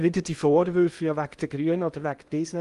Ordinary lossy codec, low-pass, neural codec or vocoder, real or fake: Opus, 32 kbps; 10.8 kHz; codec, 16 kHz in and 24 kHz out, 0.8 kbps, FocalCodec, streaming, 65536 codes; fake